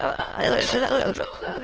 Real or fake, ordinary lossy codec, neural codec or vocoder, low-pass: fake; Opus, 16 kbps; autoencoder, 22.05 kHz, a latent of 192 numbers a frame, VITS, trained on many speakers; 7.2 kHz